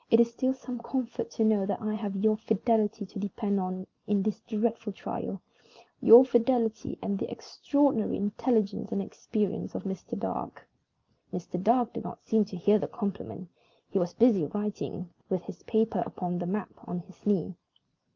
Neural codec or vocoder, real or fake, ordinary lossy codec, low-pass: none; real; Opus, 24 kbps; 7.2 kHz